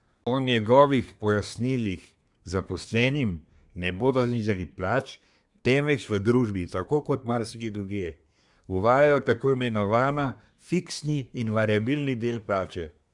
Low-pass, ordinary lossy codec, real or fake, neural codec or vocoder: 10.8 kHz; none; fake; codec, 24 kHz, 1 kbps, SNAC